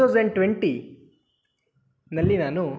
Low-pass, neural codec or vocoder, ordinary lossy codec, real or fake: none; none; none; real